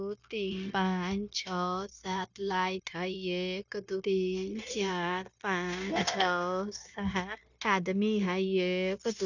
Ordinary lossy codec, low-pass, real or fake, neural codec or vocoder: none; 7.2 kHz; fake; codec, 16 kHz, 0.9 kbps, LongCat-Audio-Codec